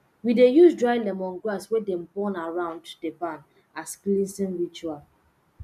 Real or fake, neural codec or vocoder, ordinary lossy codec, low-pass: real; none; MP3, 96 kbps; 14.4 kHz